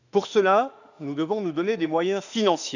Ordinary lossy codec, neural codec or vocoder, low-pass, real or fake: none; autoencoder, 48 kHz, 32 numbers a frame, DAC-VAE, trained on Japanese speech; 7.2 kHz; fake